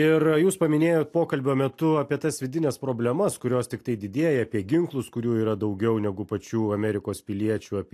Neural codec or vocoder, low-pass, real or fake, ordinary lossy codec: none; 14.4 kHz; real; AAC, 64 kbps